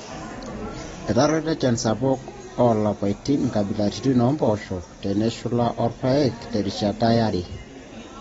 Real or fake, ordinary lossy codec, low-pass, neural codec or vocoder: real; AAC, 24 kbps; 10.8 kHz; none